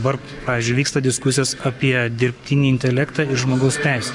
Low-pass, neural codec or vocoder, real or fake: 10.8 kHz; codec, 44.1 kHz, 7.8 kbps, Pupu-Codec; fake